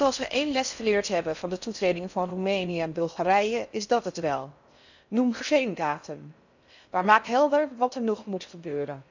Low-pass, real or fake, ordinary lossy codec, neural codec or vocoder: 7.2 kHz; fake; none; codec, 16 kHz in and 24 kHz out, 0.6 kbps, FocalCodec, streaming, 4096 codes